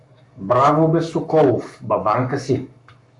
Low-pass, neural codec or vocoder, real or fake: 10.8 kHz; codec, 44.1 kHz, 7.8 kbps, Pupu-Codec; fake